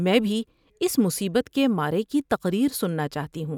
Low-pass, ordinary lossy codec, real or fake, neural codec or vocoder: 19.8 kHz; none; real; none